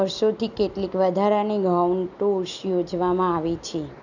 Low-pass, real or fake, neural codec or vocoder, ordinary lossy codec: 7.2 kHz; real; none; none